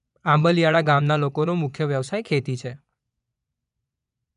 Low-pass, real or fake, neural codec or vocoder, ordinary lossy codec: 10.8 kHz; fake; vocoder, 24 kHz, 100 mel bands, Vocos; none